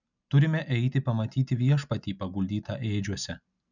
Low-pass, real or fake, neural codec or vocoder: 7.2 kHz; real; none